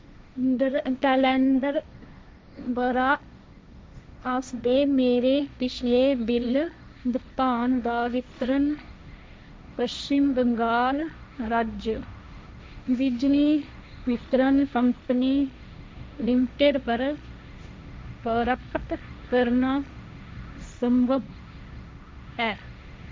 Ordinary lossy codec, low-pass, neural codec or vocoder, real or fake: none; none; codec, 16 kHz, 1.1 kbps, Voila-Tokenizer; fake